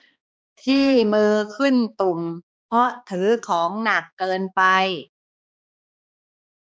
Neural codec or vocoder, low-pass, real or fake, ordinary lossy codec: codec, 16 kHz, 2 kbps, X-Codec, HuBERT features, trained on balanced general audio; none; fake; none